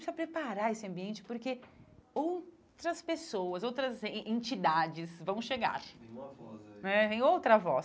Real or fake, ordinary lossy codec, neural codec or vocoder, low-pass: real; none; none; none